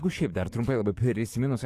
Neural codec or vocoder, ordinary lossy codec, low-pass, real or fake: codec, 44.1 kHz, 7.8 kbps, DAC; AAC, 64 kbps; 14.4 kHz; fake